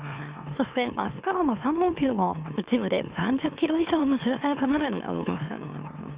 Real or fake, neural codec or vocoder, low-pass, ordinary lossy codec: fake; autoencoder, 44.1 kHz, a latent of 192 numbers a frame, MeloTTS; 3.6 kHz; Opus, 64 kbps